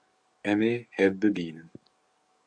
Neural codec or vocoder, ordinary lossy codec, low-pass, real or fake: codec, 44.1 kHz, 7.8 kbps, DAC; AAC, 64 kbps; 9.9 kHz; fake